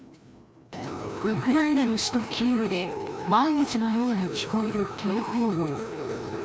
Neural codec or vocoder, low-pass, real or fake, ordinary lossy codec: codec, 16 kHz, 1 kbps, FreqCodec, larger model; none; fake; none